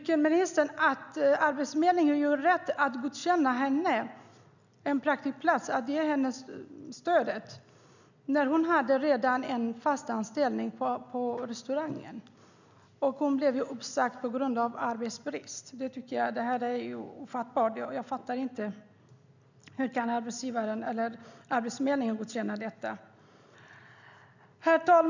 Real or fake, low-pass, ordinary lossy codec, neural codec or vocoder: real; 7.2 kHz; none; none